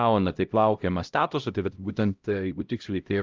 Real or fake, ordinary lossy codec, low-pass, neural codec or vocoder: fake; Opus, 24 kbps; 7.2 kHz; codec, 16 kHz, 0.5 kbps, X-Codec, HuBERT features, trained on LibriSpeech